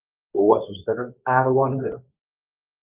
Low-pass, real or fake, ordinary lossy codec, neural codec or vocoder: 3.6 kHz; fake; Opus, 32 kbps; codec, 24 kHz, 0.9 kbps, WavTokenizer, medium speech release version 2